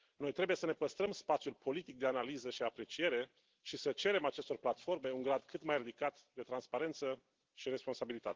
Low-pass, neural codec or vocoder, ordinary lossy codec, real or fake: 7.2 kHz; none; Opus, 16 kbps; real